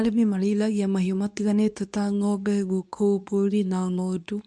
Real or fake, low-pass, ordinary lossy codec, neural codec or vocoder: fake; none; none; codec, 24 kHz, 0.9 kbps, WavTokenizer, medium speech release version 2